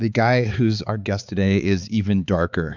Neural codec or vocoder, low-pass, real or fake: codec, 16 kHz, 4 kbps, X-Codec, HuBERT features, trained on balanced general audio; 7.2 kHz; fake